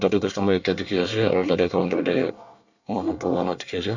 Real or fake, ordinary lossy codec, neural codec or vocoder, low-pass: fake; none; codec, 24 kHz, 1 kbps, SNAC; 7.2 kHz